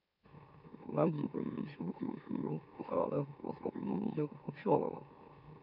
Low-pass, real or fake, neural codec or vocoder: 5.4 kHz; fake; autoencoder, 44.1 kHz, a latent of 192 numbers a frame, MeloTTS